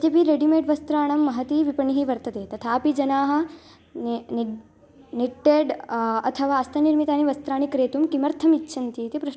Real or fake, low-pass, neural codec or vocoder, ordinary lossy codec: real; none; none; none